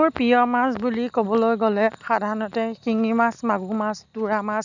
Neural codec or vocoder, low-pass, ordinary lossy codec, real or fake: none; 7.2 kHz; none; real